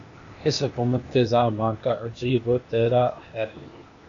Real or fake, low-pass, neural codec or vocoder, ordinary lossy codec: fake; 7.2 kHz; codec, 16 kHz, 0.8 kbps, ZipCodec; AAC, 64 kbps